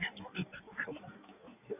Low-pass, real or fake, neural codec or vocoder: 3.6 kHz; fake; codec, 16 kHz in and 24 kHz out, 1.1 kbps, FireRedTTS-2 codec